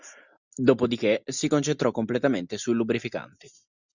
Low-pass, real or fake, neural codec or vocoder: 7.2 kHz; real; none